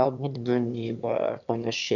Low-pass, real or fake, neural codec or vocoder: 7.2 kHz; fake; autoencoder, 22.05 kHz, a latent of 192 numbers a frame, VITS, trained on one speaker